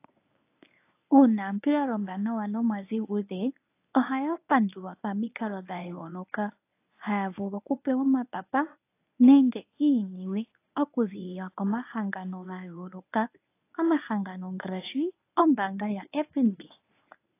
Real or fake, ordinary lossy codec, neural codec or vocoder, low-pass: fake; AAC, 24 kbps; codec, 24 kHz, 0.9 kbps, WavTokenizer, medium speech release version 1; 3.6 kHz